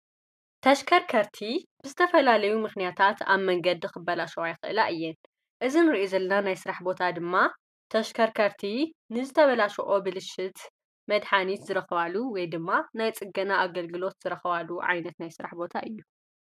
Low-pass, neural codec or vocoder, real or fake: 14.4 kHz; none; real